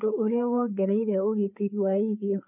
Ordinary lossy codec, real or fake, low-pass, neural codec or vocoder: none; fake; 3.6 kHz; codec, 16 kHz, 4 kbps, FreqCodec, larger model